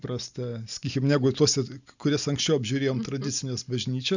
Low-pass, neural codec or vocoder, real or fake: 7.2 kHz; none; real